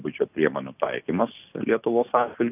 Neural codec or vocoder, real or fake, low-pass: none; real; 3.6 kHz